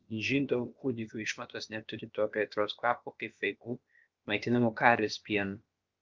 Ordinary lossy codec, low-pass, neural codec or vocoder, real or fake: Opus, 24 kbps; 7.2 kHz; codec, 16 kHz, about 1 kbps, DyCAST, with the encoder's durations; fake